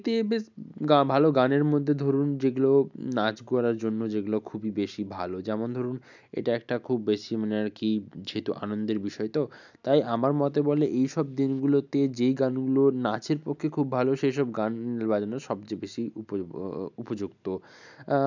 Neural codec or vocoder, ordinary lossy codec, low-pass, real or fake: none; none; 7.2 kHz; real